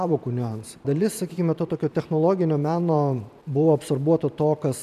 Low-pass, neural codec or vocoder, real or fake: 14.4 kHz; vocoder, 44.1 kHz, 128 mel bands every 512 samples, BigVGAN v2; fake